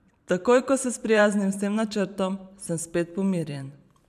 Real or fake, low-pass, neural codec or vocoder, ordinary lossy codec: fake; 14.4 kHz; vocoder, 44.1 kHz, 128 mel bands every 512 samples, BigVGAN v2; none